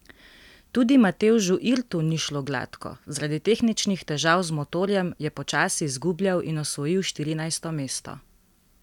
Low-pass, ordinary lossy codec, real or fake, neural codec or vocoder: 19.8 kHz; none; real; none